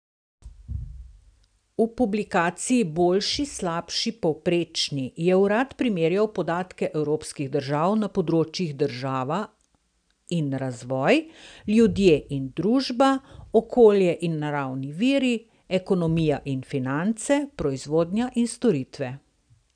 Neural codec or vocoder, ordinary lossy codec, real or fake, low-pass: none; none; real; 9.9 kHz